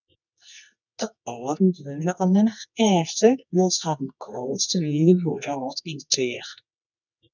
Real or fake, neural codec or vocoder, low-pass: fake; codec, 24 kHz, 0.9 kbps, WavTokenizer, medium music audio release; 7.2 kHz